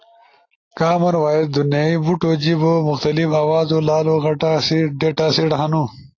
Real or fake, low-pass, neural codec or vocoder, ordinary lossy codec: real; 7.2 kHz; none; AAC, 32 kbps